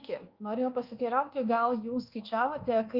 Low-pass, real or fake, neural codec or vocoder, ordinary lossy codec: 5.4 kHz; fake; codec, 24 kHz, 1.2 kbps, DualCodec; Opus, 32 kbps